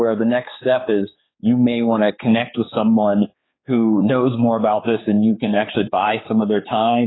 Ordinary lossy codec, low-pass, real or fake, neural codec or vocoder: AAC, 16 kbps; 7.2 kHz; fake; codec, 16 kHz, 4 kbps, X-Codec, HuBERT features, trained on general audio